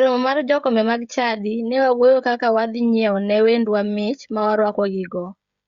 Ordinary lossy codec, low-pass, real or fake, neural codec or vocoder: Opus, 64 kbps; 7.2 kHz; fake; codec, 16 kHz, 16 kbps, FreqCodec, smaller model